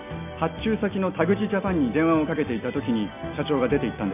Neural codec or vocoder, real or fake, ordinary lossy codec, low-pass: none; real; none; 3.6 kHz